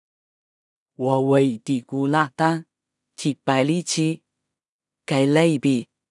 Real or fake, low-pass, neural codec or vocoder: fake; 10.8 kHz; codec, 16 kHz in and 24 kHz out, 0.4 kbps, LongCat-Audio-Codec, two codebook decoder